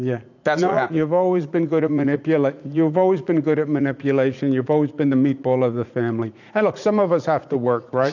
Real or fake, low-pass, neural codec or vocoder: fake; 7.2 kHz; vocoder, 44.1 kHz, 80 mel bands, Vocos